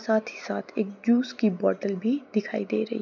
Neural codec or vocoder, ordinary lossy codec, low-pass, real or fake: none; none; 7.2 kHz; real